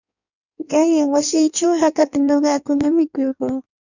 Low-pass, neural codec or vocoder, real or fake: 7.2 kHz; codec, 16 kHz in and 24 kHz out, 1.1 kbps, FireRedTTS-2 codec; fake